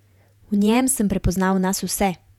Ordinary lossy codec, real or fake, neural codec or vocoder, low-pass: none; fake; vocoder, 48 kHz, 128 mel bands, Vocos; 19.8 kHz